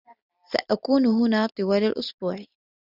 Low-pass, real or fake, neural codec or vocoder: 5.4 kHz; real; none